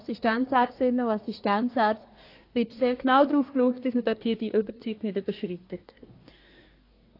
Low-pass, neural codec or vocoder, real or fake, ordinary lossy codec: 5.4 kHz; codec, 24 kHz, 1 kbps, SNAC; fake; AAC, 32 kbps